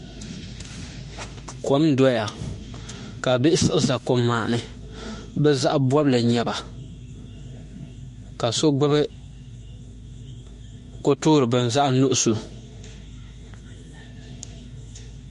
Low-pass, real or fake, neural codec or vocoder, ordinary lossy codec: 14.4 kHz; fake; autoencoder, 48 kHz, 32 numbers a frame, DAC-VAE, trained on Japanese speech; MP3, 48 kbps